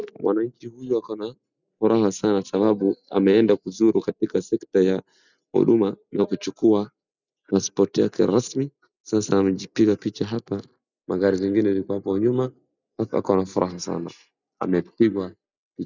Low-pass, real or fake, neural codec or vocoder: 7.2 kHz; real; none